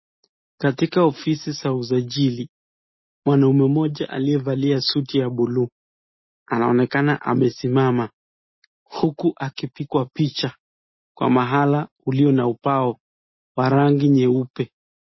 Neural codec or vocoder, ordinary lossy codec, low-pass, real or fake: none; MP3, 24 kbps; 7.2 kHz; real